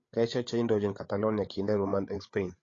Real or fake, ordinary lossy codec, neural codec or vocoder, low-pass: fake; AAC, 32 kbps; codec, 16 kHz, 8 kbps, FreqCodec, larger model; 7.2 kHz